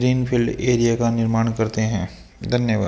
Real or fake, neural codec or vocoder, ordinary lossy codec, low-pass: real; none; none; none